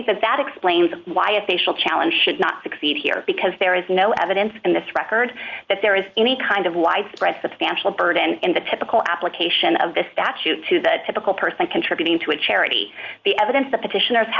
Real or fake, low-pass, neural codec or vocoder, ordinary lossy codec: real; 7.2 kHz; none; Opus, 24 kbps